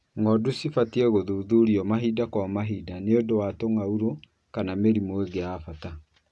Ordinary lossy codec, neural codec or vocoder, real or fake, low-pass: none; none; real; none